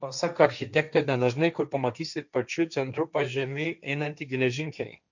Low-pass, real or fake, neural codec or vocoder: 7.2 kHz; fake; codec, 16 kHz, 1.1 kbps, Voila-Tokenizer